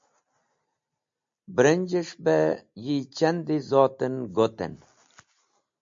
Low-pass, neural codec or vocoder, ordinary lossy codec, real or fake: 7.2 kHz; none; MP3, 48 kbps; real